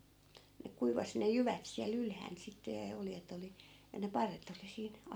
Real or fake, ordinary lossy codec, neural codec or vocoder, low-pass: real; none; none; none